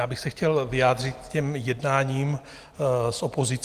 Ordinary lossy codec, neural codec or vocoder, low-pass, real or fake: Opus, 24 kbps; none; 14.4 kHz; real